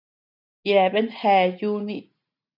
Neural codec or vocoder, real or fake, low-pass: none; real; 5.4 kHz